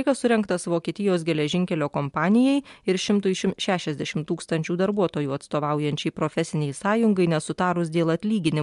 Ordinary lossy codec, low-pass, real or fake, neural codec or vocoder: MP3, 64 kbps; 10.8 kHz; real; none